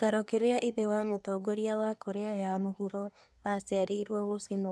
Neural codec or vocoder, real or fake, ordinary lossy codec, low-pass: codec, 24 kHz, 1 kbps, SNAC; fake; none; none